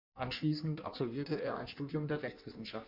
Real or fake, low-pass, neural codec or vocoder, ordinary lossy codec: fake; 5.4 kHz; codec, 16 kHz in and 24 kHz out, 1.1 kbps, FireRedTTS-2 codec; MP3, 48 kbps